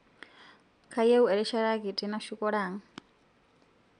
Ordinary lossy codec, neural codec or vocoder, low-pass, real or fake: none; none; 9.9 kHz; real